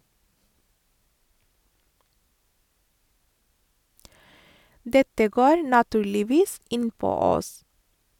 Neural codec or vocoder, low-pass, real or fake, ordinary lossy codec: none; 19.8 kHz; real; none